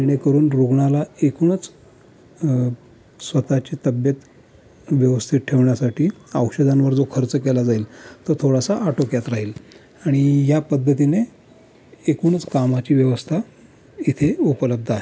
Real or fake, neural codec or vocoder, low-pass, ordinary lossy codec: real; none; none; none